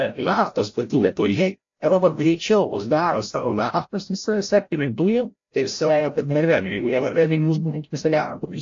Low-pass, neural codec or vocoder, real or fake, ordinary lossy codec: 7.2 kHz; codec, 16 kHz, 0.5 kbps, FreqCodec, larger model; fake; AAC, 48 kbps